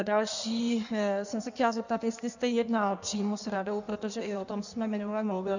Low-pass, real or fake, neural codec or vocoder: 7.2 kHz; fake; codec, 16 kHz in and 24 kHz out, 1.1 kbps, FireRedTTS-2 codec